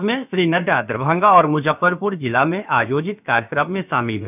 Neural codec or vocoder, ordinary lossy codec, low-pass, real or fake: codec, 16 kHz, about 1 kbps, DyCAST, with the encoder's durations; none; 3.6 kHz; fake